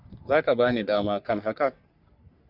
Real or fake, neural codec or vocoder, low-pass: fake; codec, 44.1 kHz, 3.4 kbps, Pupu-Codec; 5.4 kHz